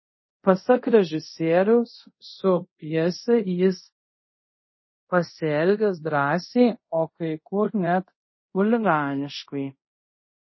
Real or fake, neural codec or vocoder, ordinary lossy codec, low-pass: fake; codec, 24 kHz, 0.5 kbps, DualCodec; MP3, 24 kbps; 7.2 kHz